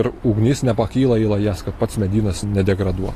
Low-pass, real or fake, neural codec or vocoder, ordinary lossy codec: 14.4 kHz; real; none; AAC, 48 kbps